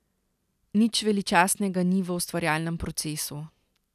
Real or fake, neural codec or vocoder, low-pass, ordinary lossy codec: real; none; 14.4 kHz; none